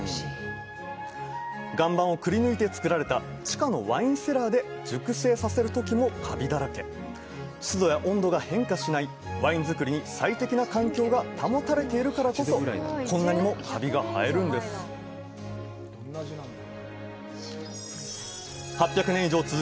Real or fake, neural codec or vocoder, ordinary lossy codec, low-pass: real; none; none; none